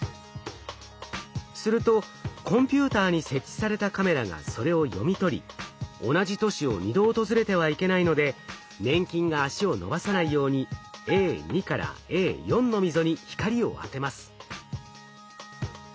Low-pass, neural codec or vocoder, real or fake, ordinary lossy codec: none; none; real; none